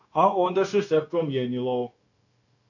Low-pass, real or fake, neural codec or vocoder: 7.2 kHz; fake; codec, 16 kHz, 0.9 kbps, LongCat-Audio-Codec